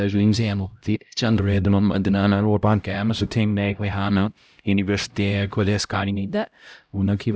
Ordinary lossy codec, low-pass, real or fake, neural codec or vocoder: none; none; fake; codec, 16 kHz, 0.5 kbps, X-Codec, HuBERT features, trained on LibriSpeech